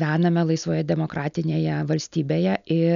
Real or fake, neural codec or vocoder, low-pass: real; none; 7.2 kHz